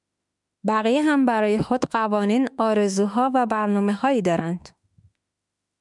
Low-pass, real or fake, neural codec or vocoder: 10.8 kHz; fake; autoencoder, 48 kHz, 32 numbers a frame, DAC-VAE, trained on Japanese speech